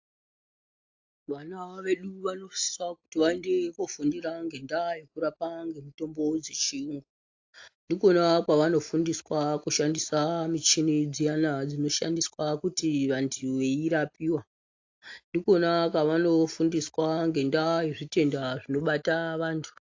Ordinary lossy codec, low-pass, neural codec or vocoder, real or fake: AAC, 48 kbps; 7.2 kHz; none; real